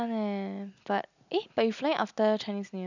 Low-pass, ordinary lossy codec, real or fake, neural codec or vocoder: 7.2 kHz; none; real; none